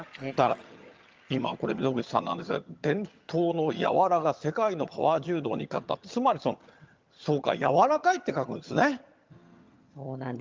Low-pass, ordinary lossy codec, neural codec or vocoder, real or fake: 7.2 kHz; Opus, 24 kbps; vocoder, 22.05 kHz, 80 mel bands, HiFi-GAN; fake